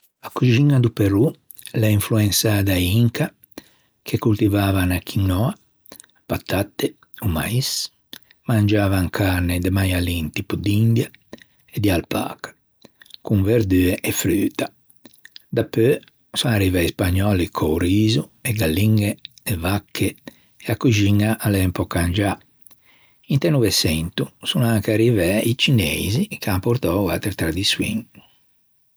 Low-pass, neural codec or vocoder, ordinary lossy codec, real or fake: none; none; none; real